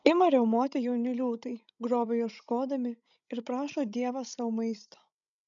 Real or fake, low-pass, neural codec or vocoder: fake; 7.2 kHz; codec, 16 kHz, 16 kbps, FreqCodec, larger model